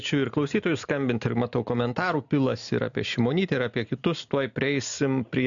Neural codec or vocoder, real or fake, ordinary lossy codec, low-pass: none; real; MP3, 96 kbps; 7.2 kHz